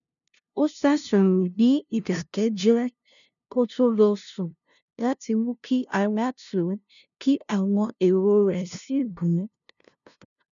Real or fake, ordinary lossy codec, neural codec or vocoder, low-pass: fake; none; codec, 16 kHz, 0.5 kbps, FunCodec, trained on LibriTTS, 25 frames a second; 7.2 kHz